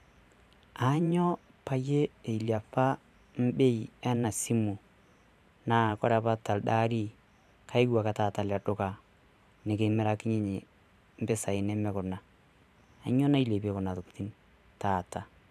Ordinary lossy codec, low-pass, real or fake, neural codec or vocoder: none; 14.4 kHz; fake; vocoder, 44.1 kHz, 128 mel bands every 512 samples, BigVGAN v2